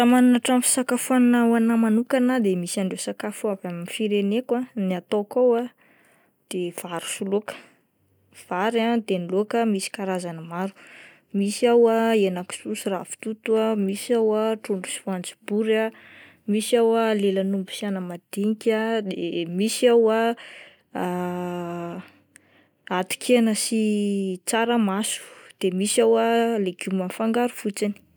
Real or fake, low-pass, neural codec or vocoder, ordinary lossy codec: real; none; none; none